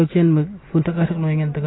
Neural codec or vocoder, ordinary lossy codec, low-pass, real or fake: none; AAC, 16 kbps; 7.2 kHz; real